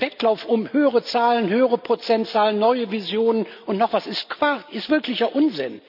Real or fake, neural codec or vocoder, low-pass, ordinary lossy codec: real; none; 5.4 kHz; none